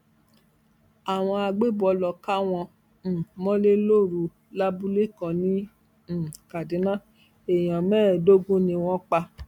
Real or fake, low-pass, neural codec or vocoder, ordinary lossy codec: real; 19.8 kHz; none; none